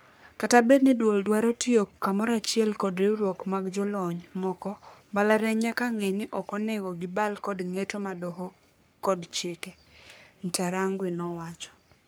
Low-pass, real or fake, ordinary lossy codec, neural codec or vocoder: none; fake; none; codec, 44.1 kHz, 3.4 kbps, Pupu-Codec